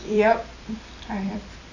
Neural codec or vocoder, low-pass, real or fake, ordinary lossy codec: vocoder, 44.1 kHz, 128 mel bands every 256 samples, BigVGAN v2; 7.2 kHz; fake; none